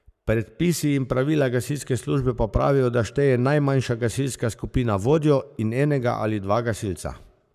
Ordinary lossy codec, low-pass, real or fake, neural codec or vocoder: none; 14.4 kHz; fake; codec, 44.1 kHz, 7.8 kbps, Pupu-Codec